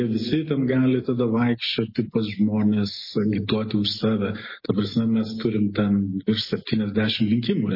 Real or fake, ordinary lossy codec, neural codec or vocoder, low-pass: real; MP3, 24 kbps; none; 5.4 kHz